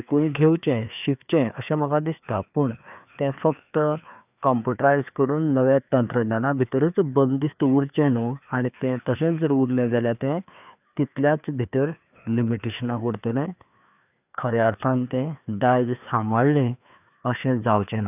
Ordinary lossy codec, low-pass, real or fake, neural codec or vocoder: none; 3.6 kHz; fake; codec, 16 kHz, 2 kbps, FreqCodec, larger model